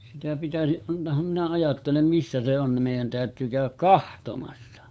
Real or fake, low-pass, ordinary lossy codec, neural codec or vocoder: fake; none; none; codec, 16 kHz, 8 kbps, FunCodec, trained on LibriTTS, 25 frames a second